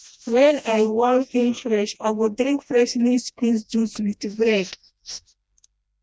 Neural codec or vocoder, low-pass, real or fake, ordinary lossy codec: codec, 16 kHz, 1 kbps, FreqCodec, smaller model; none; fake; none